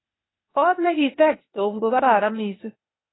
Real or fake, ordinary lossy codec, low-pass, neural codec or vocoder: fake; AAC, 16 kbps; 7.2 kHz; codec, 16 kHz, 0.8 kbps, ZipCodec